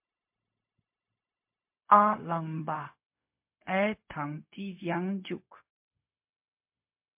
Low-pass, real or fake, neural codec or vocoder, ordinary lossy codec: 3.6 kHz; fake; codec, 16 kHz, 0.4 kbps, LongCat-Audio-Codec; MP3, 32 kbps